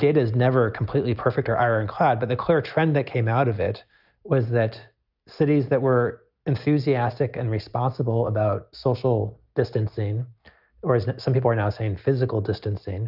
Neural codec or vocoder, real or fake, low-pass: none; real; 5.4 kHz